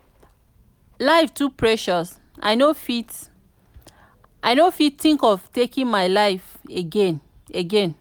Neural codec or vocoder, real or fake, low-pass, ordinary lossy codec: none; real; none; none